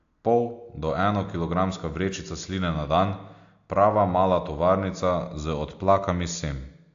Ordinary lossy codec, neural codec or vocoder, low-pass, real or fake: AAC, 64 kbps; none; 7.2 kHz; real